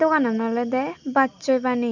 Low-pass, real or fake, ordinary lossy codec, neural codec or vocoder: 7.2 kHz; real; none; none